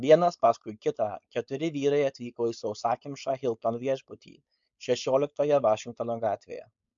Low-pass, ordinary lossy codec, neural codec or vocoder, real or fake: 7.2 kHz; MP3, 64 kbps; codec, 16 kHz, 4.8 kbps, FACodec; fake